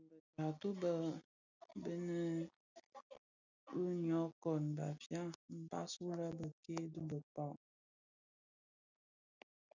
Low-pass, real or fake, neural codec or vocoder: 7.2 kHz; real; none